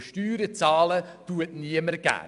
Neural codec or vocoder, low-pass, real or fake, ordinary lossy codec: none; 10.8 kHz; real; none